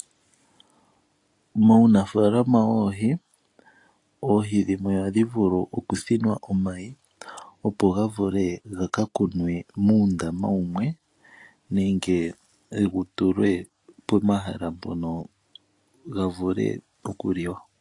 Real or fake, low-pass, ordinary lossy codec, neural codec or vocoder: real; 10.8 kHz; AAC, 48 kbps; none